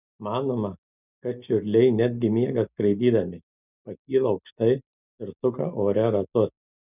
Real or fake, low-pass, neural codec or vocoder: real; 3.6 kHz; none